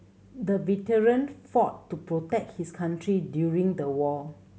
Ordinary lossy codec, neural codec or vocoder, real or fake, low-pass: none; none; real; none